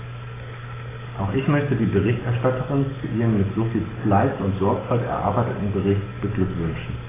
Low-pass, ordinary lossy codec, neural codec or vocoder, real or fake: 3.6 kHz; AAC, 16 kbps; codec, 44.1 kHz, 7.8 kbps, DAC; fake